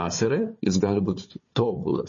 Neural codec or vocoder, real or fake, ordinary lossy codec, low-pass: codec, 16 kHz, 4 kbps, FunCodec, trained on Chinese and English, 50 frames a second; fake; MP3, 32 kbps; 7.2 kHz